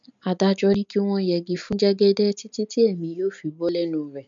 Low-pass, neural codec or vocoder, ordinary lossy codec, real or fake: 7.2 kHz; none; MP3, 64 kbps; real